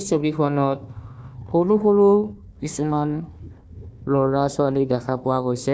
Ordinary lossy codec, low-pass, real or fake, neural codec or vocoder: none; none; fake; codec, 16 kHz, 1 kbps, FunCodec, trained on Chinese and English, 50 frames a second